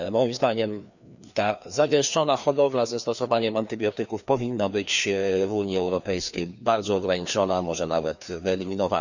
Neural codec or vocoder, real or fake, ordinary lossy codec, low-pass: codec, 16 kHz, 2 kbps, FreqCodec, larger model; fake; none; 7.2 kHz